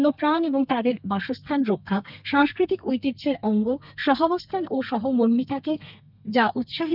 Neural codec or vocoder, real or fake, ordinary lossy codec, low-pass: codec, 32 kHz, 1.9 kbps, SNAC; fake; none; 5.4 kHz